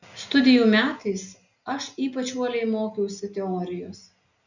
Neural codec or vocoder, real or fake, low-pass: none; real; 7.2 kHz